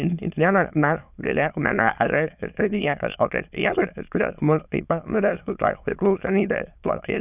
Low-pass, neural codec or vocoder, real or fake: 3.6 kHz; autoencoder, 22.05 kHz, a latent of 192 numbers a frame, VITS, trained on many speakers; fake